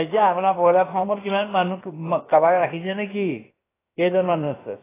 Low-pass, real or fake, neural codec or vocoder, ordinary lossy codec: 3.6 kHz; fake; codec, 16 kHz, about 1 kbps, DyCAST, with the encoder's durations; AAC, 16 kbps